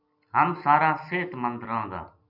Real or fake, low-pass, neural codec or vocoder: real; 5.4 kHz; none